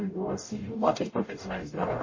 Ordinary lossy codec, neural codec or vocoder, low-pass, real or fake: MP3, 32 kbps; codec, 44.1 kHz, 0.9 kbps, DAC; 7.2 kHz; fake